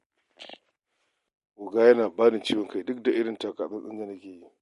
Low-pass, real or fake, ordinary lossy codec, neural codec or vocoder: 14.4 kHz; real; MP3, 48 kbps; none